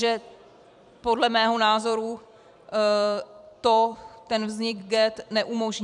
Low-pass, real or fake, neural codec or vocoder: 10.8 kHz; fake; vocoder, 44.1 kHz, 128 mel bands every 256 samples, BigVGAN v2